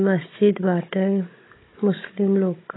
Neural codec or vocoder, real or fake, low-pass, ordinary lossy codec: codec, 16 kHz, 16 kbps, FunCodec, trained on Chinese and English, 50 frames a second; fake; 7.2 kHz; AAC, 16 kbps